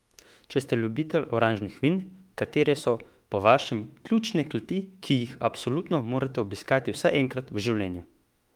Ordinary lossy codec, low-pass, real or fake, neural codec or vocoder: Opus, 32 kbps; 19.8 kHz; fake; autoencoder, 48 kHz, 32 numbers a frame, DAC-VAE, trained on Japanese speech